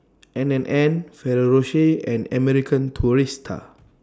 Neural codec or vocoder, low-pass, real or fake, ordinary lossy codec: none; none; real; none